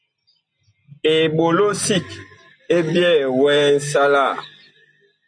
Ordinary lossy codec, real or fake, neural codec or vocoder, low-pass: MP3, 64 kbps; real; none; 9.9 kHz